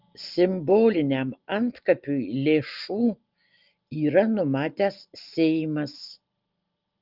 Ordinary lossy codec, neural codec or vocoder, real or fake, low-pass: Opus, 32 kbps; none; real; 5.4 kHz